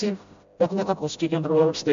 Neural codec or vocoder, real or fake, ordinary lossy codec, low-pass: codec, 16 kHz, 0.5 kbps, FreqCodec, smaller model; fake; AAC, 64 kbps; 7.2 kHz